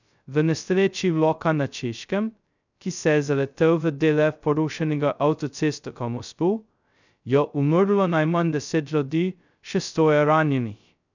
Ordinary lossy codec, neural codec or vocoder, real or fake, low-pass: none; codec, 16 kHz, 0.2 kbps, FocalCodec; fake; 7.2 kHz